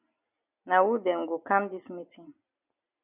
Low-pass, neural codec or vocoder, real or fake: 3.6 kHz; none; real